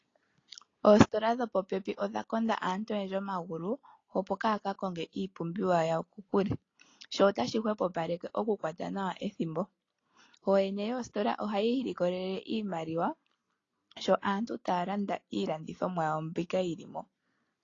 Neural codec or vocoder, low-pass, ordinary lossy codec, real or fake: none; 7.2 kHz; AAC, 32 kbps; real